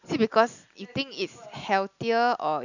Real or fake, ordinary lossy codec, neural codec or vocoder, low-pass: real; none; none; 7.2 kHz